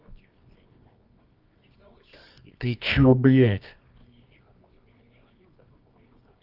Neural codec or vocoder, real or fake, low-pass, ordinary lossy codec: codec, 24 kHz, 0.9 kbps, WavTokenizer, medium music audio release; fake; 5.4 kHz; Opus, 24 kbps